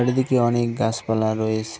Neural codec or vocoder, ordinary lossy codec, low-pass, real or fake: none; none; none; real